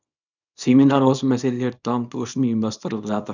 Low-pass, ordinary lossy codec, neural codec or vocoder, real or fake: 7.2 kHz; none; codec, 24 kHz, 0.9 kbps, WavTokenizer, small release; fake